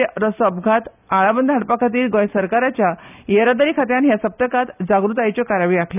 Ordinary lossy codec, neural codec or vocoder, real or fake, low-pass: none; none; real; 3.6 kHz